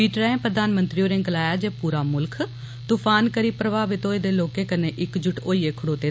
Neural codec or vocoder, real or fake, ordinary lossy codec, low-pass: none; real; none; none